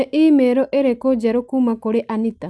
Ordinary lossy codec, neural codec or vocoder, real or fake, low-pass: none; none; real; none